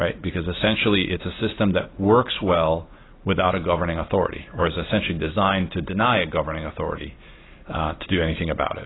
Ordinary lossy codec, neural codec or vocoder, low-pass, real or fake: AAC, 16 kbps; none; 7.2 kHz; real